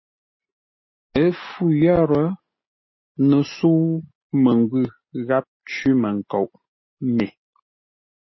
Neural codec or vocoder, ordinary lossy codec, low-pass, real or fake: none; MP3, 24 kbps; 7.2 kHz; real